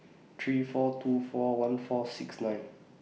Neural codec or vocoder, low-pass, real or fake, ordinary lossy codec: none; none; real; none